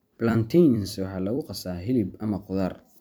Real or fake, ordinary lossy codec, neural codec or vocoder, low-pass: fake; none; vocoder, 44.1 kHz, 128 mel bands every 256 samples, BigVGAN v2; none